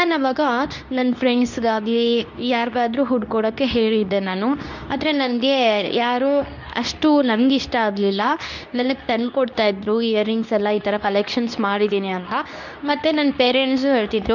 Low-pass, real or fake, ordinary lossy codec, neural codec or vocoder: 7.2 kHz; fake; none; codec, 24 kHz, 0.9 kbps, WavTokenizer, medium speech release version 2